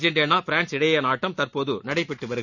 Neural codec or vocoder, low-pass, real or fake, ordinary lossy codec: none; 7.2 kHz; real; none